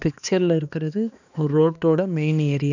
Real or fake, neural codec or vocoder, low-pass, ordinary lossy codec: fake; codec, 16 kHz, 2 kbps, X-Codec, HuBERT features, trained on balanced general audio; 7.2 kHz; none